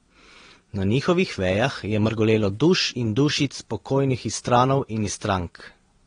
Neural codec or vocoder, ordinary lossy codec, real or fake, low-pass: vocoder, 22.05 kHz, 80 mel bands, Vocos; AAC, 32 kbps; fake; 9.9 kHz